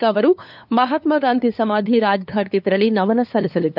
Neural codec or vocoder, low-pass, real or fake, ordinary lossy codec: codec, 16 kHz, 2 kbps, FunCodec, trained on LibriTTS, 25 frames a second; 5.4 kHz; fake; none